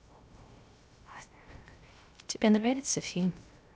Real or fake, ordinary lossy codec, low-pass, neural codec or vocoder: fake; none; none; codec, 16 kHz, 0.3 kbps, FocalCodec